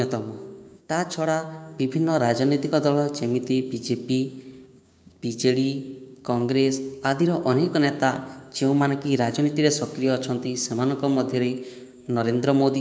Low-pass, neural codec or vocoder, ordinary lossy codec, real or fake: none; codec, 16 kHz, 6 kbps, DAC; none; fake